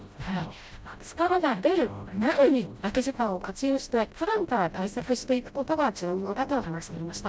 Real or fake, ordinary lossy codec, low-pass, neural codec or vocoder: fake; none; none; codec, 16 kHz, 0.5 kbps, FreqCodec, smaller model